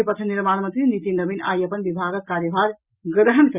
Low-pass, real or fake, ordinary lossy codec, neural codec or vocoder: 3.6 kHz; real; Opus, 64 kbps; none